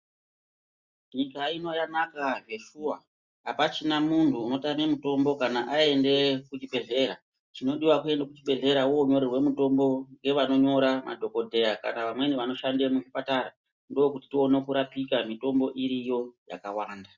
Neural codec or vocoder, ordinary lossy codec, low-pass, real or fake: none; Opus, 64 kbps; 7.2 kHz; real